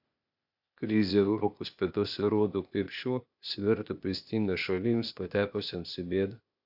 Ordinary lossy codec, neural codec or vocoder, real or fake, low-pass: AAC, 48 kbps; codec, 16 kHz, 0.8 kbps, ZipCodec; fake; 5.4 kHz